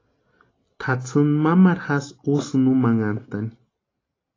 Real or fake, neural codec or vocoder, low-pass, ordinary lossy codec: real; none; 7.2 kHz; AAC, 32 kbps